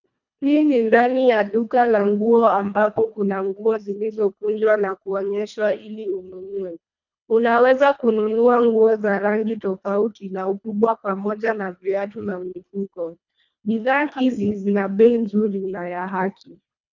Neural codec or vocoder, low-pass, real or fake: codec, 24 kHz, 1.5 kbps, HILCodec; 7.2 kHz; fake